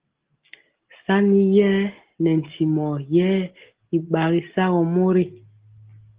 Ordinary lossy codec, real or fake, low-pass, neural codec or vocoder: Opus, 16 kbps; real; 3.6 kHz; none